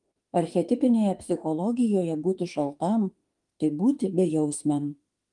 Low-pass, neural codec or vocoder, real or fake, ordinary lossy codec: 10.8 kHz; autoencoder, 48 kHz, 32 numbers a frame, DAC-VAE, trained on Japanese speech; fake; Opus, 24 kbps